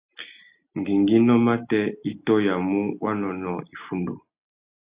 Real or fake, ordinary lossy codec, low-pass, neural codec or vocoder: real; Opus, 64 kbps; 3.6 kHz; none